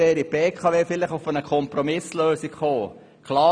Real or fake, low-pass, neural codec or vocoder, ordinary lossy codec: real; 9.9 kHz; none; none